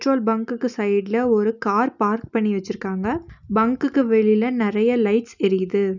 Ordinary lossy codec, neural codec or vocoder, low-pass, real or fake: none; none; 7.2 kHz; real